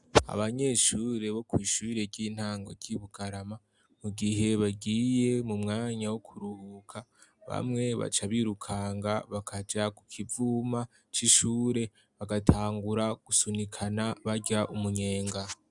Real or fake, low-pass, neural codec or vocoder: real; 10.8 kHz; none